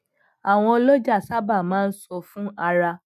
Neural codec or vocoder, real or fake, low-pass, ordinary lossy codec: none; real; 14.4 kHz; none